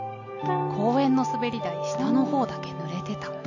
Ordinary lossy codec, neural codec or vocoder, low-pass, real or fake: none; none; 7.2 kHz; real